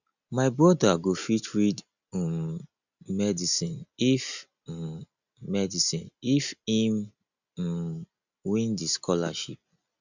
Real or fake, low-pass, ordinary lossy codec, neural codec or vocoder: real; 7.2 kHz; none; none